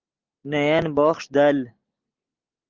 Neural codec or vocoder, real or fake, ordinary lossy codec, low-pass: none; real; Opus, 32 kbps; 7.2 kHz